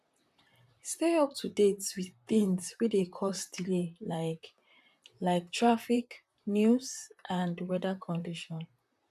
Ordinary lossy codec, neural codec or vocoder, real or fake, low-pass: none; vocoder, 44.1 kHz, 128 mel bands, Pupu-Vocoder; fake; 14.4 kHz